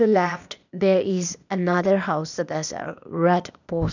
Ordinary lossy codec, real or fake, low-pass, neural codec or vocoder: none; fake; 7.2 kHz; codec, 16 kHz, 0.8 kbps, ZipCodec